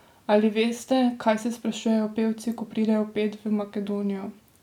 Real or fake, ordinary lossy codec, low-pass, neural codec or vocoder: real; none; 19.8 kHz; none